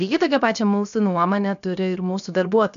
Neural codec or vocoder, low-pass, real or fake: codec, 16 kHz, 0.7 kbps, FocalCodec; 7.2 kHz; fake